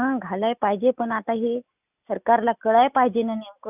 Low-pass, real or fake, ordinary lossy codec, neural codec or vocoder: 3.6 kHz; real; none; none